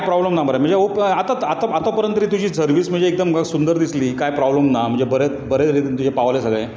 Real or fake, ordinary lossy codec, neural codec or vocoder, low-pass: real; none; none; none